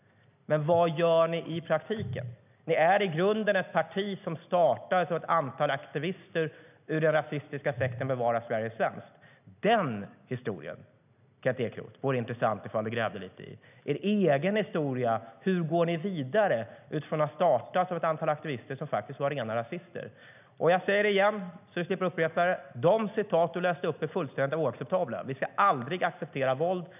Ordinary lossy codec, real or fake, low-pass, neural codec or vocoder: none; real; 3.6 kHz; none